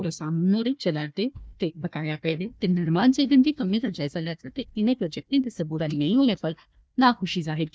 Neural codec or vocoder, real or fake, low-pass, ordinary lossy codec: codec, 16 kHz, 1 kbps, FunCodec, trained on Chinese and English, 50 frames a second; fake; none; none